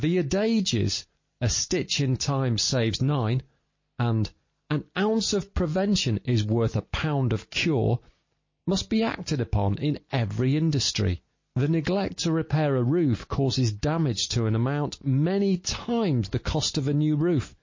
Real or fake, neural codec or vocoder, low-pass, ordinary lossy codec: real; none; 7.2 kHz; MP3, 32 kbps